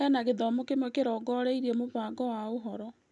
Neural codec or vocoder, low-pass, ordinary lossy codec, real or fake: none; 10.8 kHz; none; real